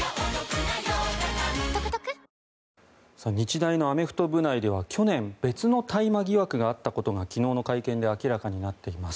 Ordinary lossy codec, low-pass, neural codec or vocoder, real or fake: none; none; none; real